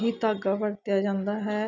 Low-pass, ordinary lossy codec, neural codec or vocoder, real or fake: 7.2 kHz; none; none; real